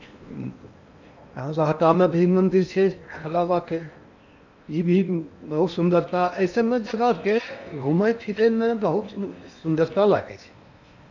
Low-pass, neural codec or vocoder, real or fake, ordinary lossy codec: 7.2 kHz; codec, 16 kHz in and 24 kHz out, 0.8 kbps, FocalCodec, streaming, 65536 codes; fake; none